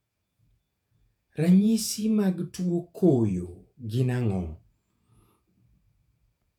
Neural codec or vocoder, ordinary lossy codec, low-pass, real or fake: vocoder, 48 kHz, 128 mel bands, Vocos; none; 19.8 kHz; fake